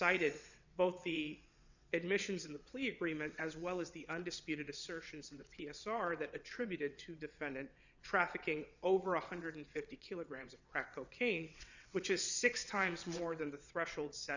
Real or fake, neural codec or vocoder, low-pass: fake; vocoder, 22.05 kHz, 80 mel bands, WaveNeXt; 7.2 kHz